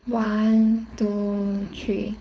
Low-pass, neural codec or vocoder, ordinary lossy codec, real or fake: none; codec, 16 kHz, 4.8 kbps, FACodec; none; fake